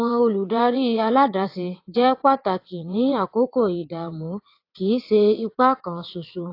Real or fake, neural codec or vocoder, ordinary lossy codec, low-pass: fake; vocoder, 22.05 kHz, 80 mel bands, WaveNeXt; AAC, 32 kbps; 5.4 kHz